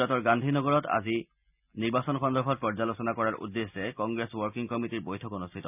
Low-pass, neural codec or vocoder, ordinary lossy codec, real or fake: 3.6 kHz; none; none; real